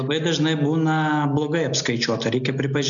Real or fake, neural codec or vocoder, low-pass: real; none; 7.2 kHz